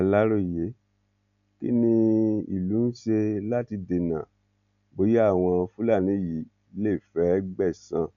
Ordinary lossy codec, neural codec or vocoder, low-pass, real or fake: none; none; 7.2 kHz; real